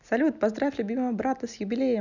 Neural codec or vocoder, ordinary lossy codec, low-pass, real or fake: none; none; 7.2 kHz; real